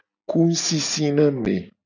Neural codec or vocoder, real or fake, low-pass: none; real; 7.2 kHz